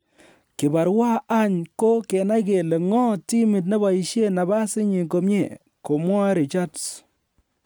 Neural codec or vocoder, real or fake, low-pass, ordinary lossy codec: none; real; none; none